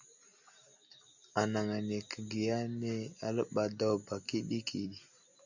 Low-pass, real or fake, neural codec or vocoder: 7.2 kHz; real; none